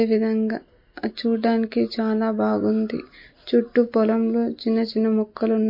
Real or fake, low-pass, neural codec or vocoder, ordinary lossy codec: real; 5.4 kHz; none; MP3, 32 kbps